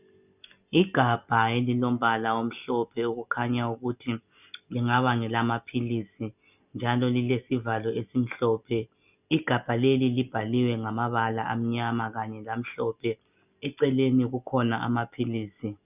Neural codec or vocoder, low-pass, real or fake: none; 3.6 kHz; real